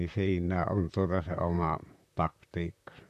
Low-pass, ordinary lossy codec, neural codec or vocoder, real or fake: 14.4 kHz; none; vocoder, 44.1 kHz, 128 mel bands, Pupu-Vocoder; fake